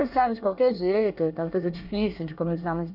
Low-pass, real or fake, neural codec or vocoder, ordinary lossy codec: 5.4 kHz; fake; codec, 24 kHz, 1 kbps, SNAC; none